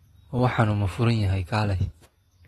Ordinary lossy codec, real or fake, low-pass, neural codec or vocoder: AAC, 32 kbps; real; 14.4 kHz; none